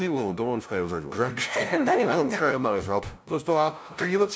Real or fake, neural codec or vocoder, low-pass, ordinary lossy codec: fake; codec, 16 kHz, 0.5 kbps, FunCodec, trained on LibriTTS, 25 frames a second; none; none